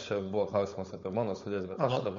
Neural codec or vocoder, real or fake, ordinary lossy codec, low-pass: codec, 16 kHz, 4.8 kbps, FACodec; fake; MP3, 48 kbps; 7.2 kHz